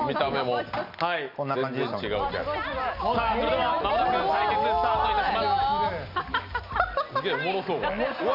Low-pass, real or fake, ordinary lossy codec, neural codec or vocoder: 5.4 kHz; real; none; none